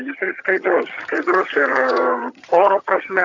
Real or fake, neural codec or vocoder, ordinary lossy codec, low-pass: fake; vocoder, 22.05 kHz, 80 mel bands, HiFi-GAN; AAC, 48 kbps; 7.2 kHz